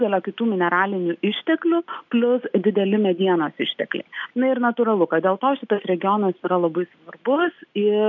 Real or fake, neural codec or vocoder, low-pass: real; none; 7.2 kHz